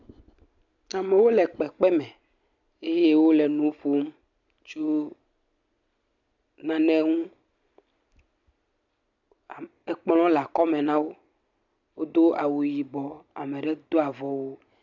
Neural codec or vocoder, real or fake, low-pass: none; real; 7.2 kHz